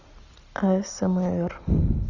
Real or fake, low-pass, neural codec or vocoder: real; 7.2 kHz; none